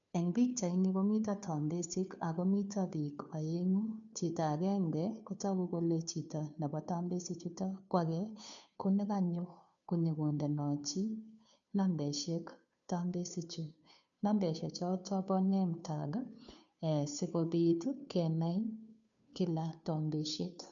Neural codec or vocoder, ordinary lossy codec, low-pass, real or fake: codec, 16 kHz, 2 kbps, FunCodec, trained on Chinese and English, 25 frames a second; none; 7.2 kHz; fake